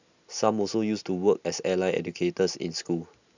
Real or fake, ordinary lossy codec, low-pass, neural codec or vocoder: real; none; 7.2 kHz; none